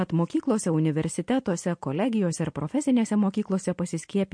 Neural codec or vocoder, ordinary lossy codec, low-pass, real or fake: none; MP3, 48 kbps; 9.9 kHz; real